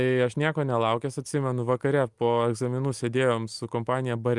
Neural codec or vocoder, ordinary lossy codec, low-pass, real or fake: none; Opus, 32 kbps; 10.8 kHz; real